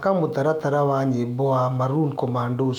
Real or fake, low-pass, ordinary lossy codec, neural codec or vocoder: fake; 19.8 kHz; none; autoencoder, 48 kHz, 128 numbers a frame, DAC-VAE, trained on Japanese speech